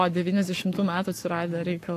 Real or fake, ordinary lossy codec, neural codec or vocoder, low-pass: fake; AAC, 64 kbps; codec, 44.1 kHz, 7.8 kbps, Pupu-Codec; 14.4 kHz